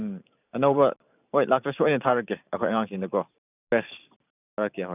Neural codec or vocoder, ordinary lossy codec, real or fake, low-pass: vocoder, 44.1 kHz, 128 mel bands every 512 samples, BigVGAN v2; none; fake; 3.6 kHz